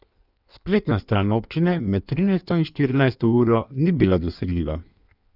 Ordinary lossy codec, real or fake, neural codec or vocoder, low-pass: none; fake; codec, 16 kHz in and 24 kHz out, 1.1 kbps, FireRedTTS-2 codec; 5.4 kHz